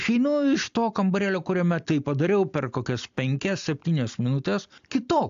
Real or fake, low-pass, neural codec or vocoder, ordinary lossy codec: real; 7.2 kHz; none; AAC, 64 kbps